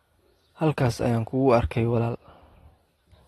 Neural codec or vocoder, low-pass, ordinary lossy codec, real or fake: none; 19.8 kHz; AAC, 32 kbps; real